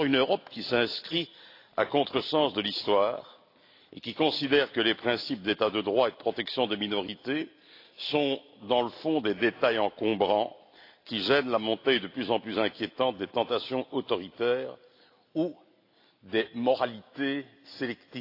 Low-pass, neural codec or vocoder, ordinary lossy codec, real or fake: 5.4 kHz; none; AAC, 32 kbps; real